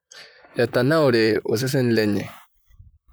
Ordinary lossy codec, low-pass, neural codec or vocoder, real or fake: none; none; vocoder, 44.1 kHz, 128 mel bands, Pupu-Vocoder; fake